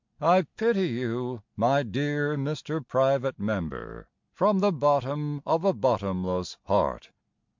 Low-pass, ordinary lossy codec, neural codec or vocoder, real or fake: 7.2 kHz; MP3, 64 kbps; none; real